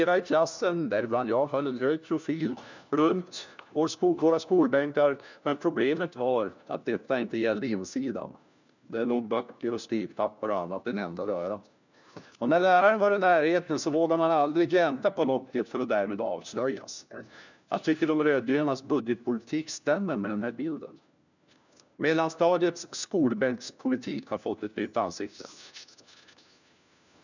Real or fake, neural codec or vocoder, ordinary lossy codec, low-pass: fake; codec, 16 kHz, 1 kbps, FunCodec, trained on LibriTTS, 50 frames a second; none; 7.2 kHz